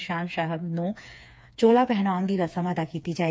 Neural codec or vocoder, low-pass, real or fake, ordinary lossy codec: codec, 16 kHz, 4 kbps, FreqCodec, smaller model; none; fake; none